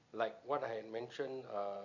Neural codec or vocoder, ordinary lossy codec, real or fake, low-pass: none; none; real; 7.2 kHz